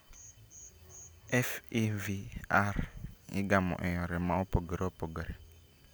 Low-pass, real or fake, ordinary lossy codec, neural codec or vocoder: none; real; none; none